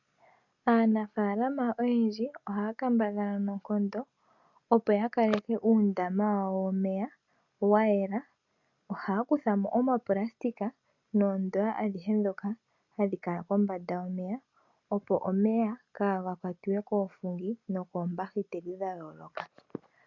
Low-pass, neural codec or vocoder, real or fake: 7.2 kHz; none; real